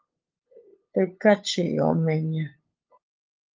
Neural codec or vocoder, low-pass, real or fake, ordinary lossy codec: codec, 16 kHz, 8 kbps, FunCodec, trained on LibriTTS, 25 frames a second; 7.2 kHz; fake; Opus, 24 kbps